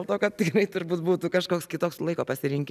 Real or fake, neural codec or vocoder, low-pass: real; none; 14.4 kHz